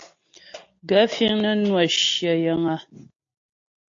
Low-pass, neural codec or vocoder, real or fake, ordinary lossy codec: 7.2 kHz; none; real; AAC, 48 kbps